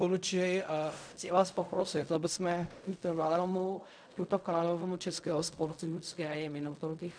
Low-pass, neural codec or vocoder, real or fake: 9.9 kHz; codec, 16 kHz in and 24 kHz out, 0.4 kbps, LongCat-Audio-Codec, fine tuned four codebook decoder; fake